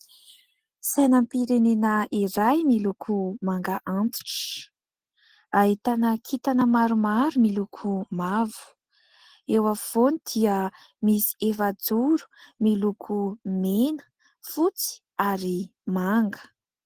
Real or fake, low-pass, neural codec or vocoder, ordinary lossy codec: real; 14.4 kHz; none; Opus, 16 kbps